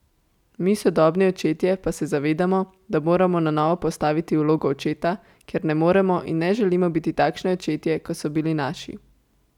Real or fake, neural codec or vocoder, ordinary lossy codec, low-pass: real; none; none; 19.8 kHz